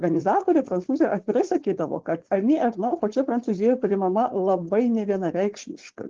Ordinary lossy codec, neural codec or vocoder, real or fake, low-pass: Opus, 16 kbps; codec, 16 kHz, 4.8 kbps, FACodec; fake; 7.2 kHz